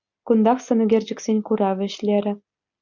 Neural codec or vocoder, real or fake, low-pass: none; real; 7.2 kHz